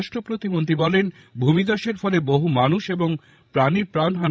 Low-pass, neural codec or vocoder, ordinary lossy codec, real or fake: none; codec, 16 kHz, 16 kbps, FreqCodec, larger model; none; fake